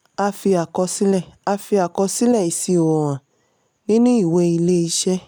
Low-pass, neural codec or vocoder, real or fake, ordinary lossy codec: none; none; real; none